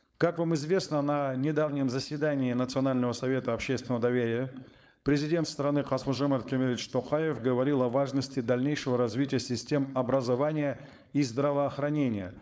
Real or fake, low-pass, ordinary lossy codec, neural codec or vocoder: fake; none; none; codec, 16 kHz, 4.8 kbps, FACodec